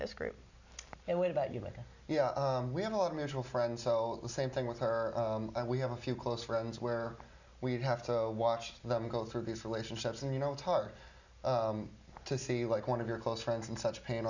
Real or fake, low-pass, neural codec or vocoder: real; 7.2 kHz; none